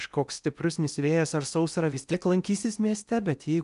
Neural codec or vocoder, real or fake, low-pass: codec, 16 kHz in and 24 kHz out, 0.8 kbps, FocalCodec, streaming, 65536 codes; fake; 10.8 kHz